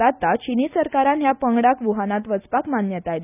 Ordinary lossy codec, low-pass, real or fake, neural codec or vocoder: none; 3.6 kHz; real; none